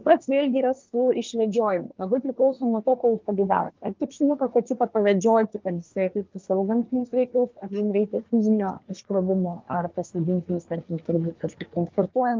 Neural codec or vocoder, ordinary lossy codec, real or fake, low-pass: codec, 24 kHz, 1 kbps, SNAC; Opus, 24 kbps; fake; 7.2 kHz